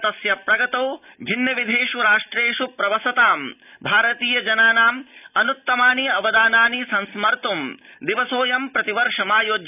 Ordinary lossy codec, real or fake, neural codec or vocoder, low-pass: none; real; none; 3.6 kHz